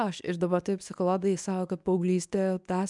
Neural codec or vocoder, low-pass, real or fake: codec, 24 kHz, 0.9 kbps, WavTokenizer, medium speech release version 1; 10.8 kHz; fake